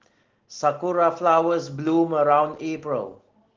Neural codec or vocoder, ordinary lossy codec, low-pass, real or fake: none; Opus, 16 kbps; 7.2 kHz; real